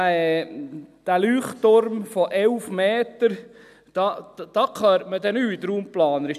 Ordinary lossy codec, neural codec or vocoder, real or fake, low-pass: none; none; real; 14.4 kHz